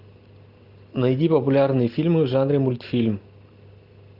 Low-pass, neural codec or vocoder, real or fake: 5.4 kHz; none; real